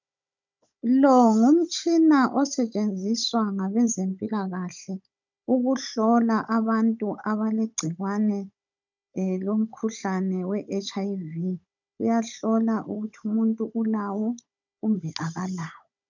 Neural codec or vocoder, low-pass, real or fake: codec, 16 kHz, 16 kbps, FunCodec, trained on Chinese and English, 50 frames a second; 7.2 kHz; fake